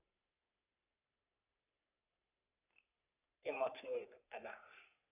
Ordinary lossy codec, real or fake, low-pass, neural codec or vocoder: none; fake; 3.6 kHz; codec, 44.1 kHz, 3.4 kbps, Pupu-Codec